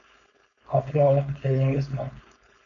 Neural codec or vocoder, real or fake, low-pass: codec, 16 kHz, 4.8 kbps, FACodec; fake; 7.2 kHz